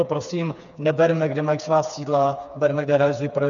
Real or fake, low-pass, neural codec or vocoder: fake; 7.2 kHz; codec, 16 kHz, 4 kbps, FreqCodec, smaller model